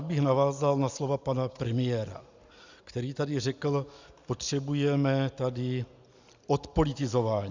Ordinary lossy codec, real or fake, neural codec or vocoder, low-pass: Opus, 64 kbps; real; none; 7.2 kHz